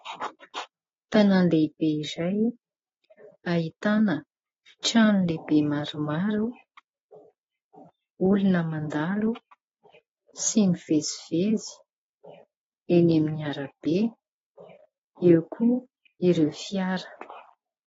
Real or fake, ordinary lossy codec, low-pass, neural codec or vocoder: real; AAC, 24 kbps; 7.2 kHz; none